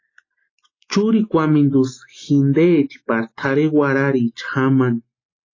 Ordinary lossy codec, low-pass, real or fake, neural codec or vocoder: AAC, 32 kbps; 7.2 kHz; real; none